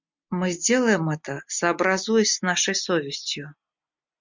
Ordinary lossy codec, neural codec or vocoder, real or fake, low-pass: MP3, 64 kbps; none; real; 7.2 kHz